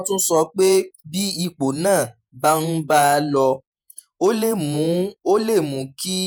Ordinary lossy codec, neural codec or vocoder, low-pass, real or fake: none; vocoder, 48 kHz, 128 mel bands, Vocos; none; fake